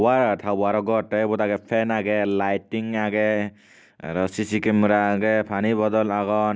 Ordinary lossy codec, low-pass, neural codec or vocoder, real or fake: none; none; none; real